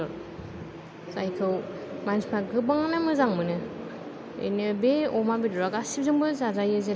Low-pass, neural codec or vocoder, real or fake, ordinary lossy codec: none; none; real; none